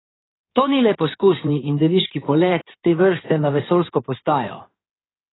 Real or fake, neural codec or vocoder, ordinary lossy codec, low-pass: fake; codec, 16 kHz in and 24 kHz out, 2.2 kbps, FireRedTTS-2 codec; AAC, 16 kbps; 7.2 kHz